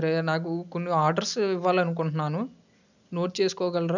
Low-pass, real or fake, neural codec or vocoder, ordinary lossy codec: 7.2 kHz; real; none; none